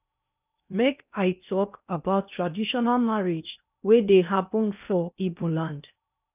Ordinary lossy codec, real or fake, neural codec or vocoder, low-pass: none; fake; codec, 16 kHz in and 24 kHz out, 0.8 kbps, FocalCodec, streaming, 65536 codes; 3.6 kHz